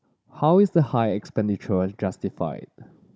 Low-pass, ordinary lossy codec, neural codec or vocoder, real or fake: none; none; codec, 16 kHz, 16 kbps, FunCodec, trained on Chinese and English, 50 frames a second; fake